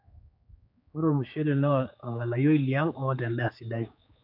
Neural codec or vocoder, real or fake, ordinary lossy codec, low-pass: codec, 16 kHz, 4 kbps, X-Codec, HuBERT features, trained on general audio; fake; none; 5.4 kHz